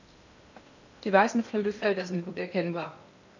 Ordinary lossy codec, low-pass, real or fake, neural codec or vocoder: none; 7.2 kHz; fake; codec, 16 kHz in and 24 kHz out, 0.8 kbps, FocalCodec, streaming, 65536 codes